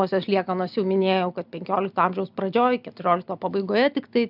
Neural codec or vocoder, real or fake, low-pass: none; real; 5.4 kHz